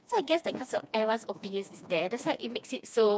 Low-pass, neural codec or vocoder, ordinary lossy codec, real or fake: none; codec, 16 kHz, 2 kbps, FreqCodec, smaller model; none; fake